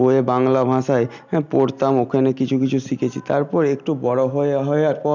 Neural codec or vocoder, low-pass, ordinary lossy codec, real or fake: none; 7.2 kHz; none; real